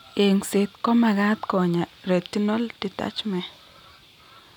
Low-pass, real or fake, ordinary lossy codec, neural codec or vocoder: 19.8 kHz; real; none; none